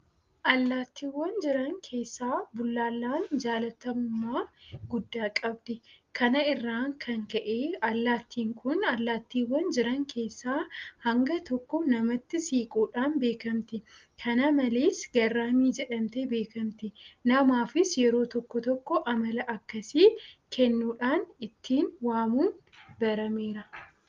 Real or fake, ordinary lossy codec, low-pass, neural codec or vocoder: real; Opus, 32 kbps; 7.2 kHz; none